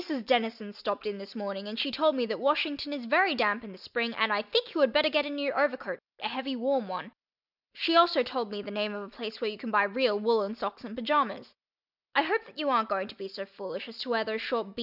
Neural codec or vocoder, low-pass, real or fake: none; 5.4 kHz; real